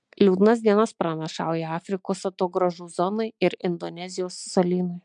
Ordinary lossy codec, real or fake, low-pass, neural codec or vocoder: MP3, 64 kbps; real; 9.9 kHz; none